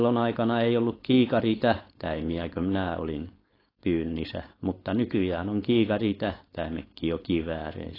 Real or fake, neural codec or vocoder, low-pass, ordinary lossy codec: fake; codec, 16 kHz, 4.8 kbps, FACodec; 5.4 kHz; AAC, 24 kbps